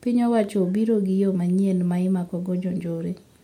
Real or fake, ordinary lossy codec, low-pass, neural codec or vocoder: real; MP3, 64 kbps; 19.8 kHz; none